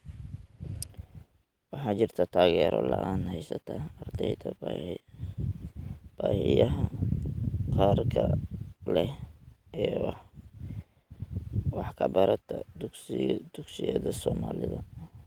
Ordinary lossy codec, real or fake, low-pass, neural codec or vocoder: Opus, 24 kbps; real; 19.8 kHz; none